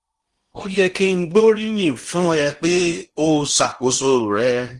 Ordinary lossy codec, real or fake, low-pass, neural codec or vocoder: Opus, 24 kbps; fake; 10.8 kHz; codec, 16 kHz in and 24 kHz out, 0.8 kbps, FocalCodec, streaming, 65536 codes